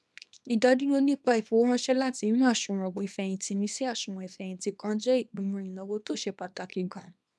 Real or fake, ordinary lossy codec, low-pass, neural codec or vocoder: fake; none; none; codec, 24 kHz, 0.9 kbps, WavTokenizer, small release